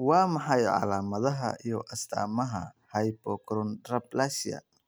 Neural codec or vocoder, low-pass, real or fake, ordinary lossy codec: none; none; real; none